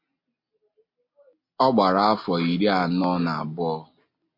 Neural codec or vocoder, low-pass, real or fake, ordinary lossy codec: none; 5.4 kHz; real; MP3, 32 kbps